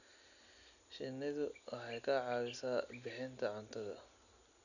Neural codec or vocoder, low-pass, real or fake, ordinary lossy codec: none; 7.2 kHz; real; none